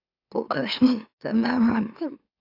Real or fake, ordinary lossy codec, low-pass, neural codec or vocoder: fake; AAC, 48 kbps; 5.4 kHz; autoencoder, 44.1 kHz, a latent of 192 numbers a frame, MeloTTS